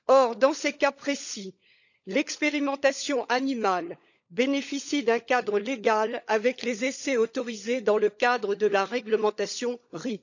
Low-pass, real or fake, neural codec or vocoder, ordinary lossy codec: 7.2 kHz; fake; codec, 16 kHz, 16 kbps, FunCodec, trained on LibriTTS, 50 frames a second; none